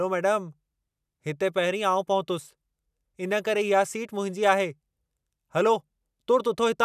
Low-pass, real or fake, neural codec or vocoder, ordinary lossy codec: 14.4 kHz; real; none; none